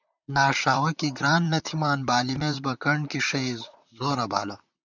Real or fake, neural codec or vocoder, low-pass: fake; vocoder, 44.1 kHz, 128 mel bands, Pupu-Vocoder; 7.2 kHz